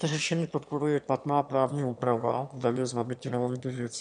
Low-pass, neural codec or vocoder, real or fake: 9.9 kHz; autoencoder, 22.05 kHz, a latent of 192 numbers a frame, VITS, trained on one speaker; fake